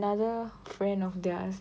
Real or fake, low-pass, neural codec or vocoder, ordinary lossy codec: real; none; none; none